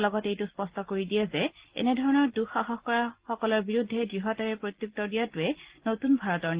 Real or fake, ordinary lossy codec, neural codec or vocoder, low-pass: real; Opus, 16 kbps; none; 3.6 kHz